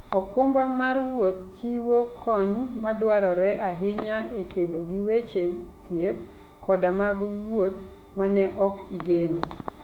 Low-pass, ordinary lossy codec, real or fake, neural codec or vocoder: 19.8 kHz; Opus, 64 kbps; fake; autoencoder, 48 kHz, 32 numbers a frame, DAC-VAE, trained on Japanese speech